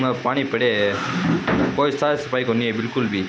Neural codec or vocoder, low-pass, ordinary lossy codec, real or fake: none; none; none; real